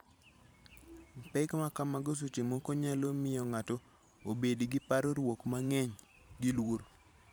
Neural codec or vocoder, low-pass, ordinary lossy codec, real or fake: vocoder, 44.1 kHz, 128 mel bands every 512 samples, BigVGAN v2; none; none; fake